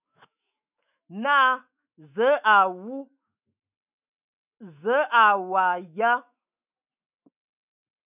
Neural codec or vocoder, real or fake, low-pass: autoencoder, 48 kHz, 128 numbers a frame, DAC-VAE, trained on Japanese speech; fake; 3.6 kHz